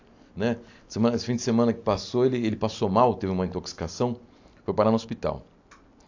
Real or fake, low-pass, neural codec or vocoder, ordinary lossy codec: real; 7.2 kHz; none; AAC, 48 kbps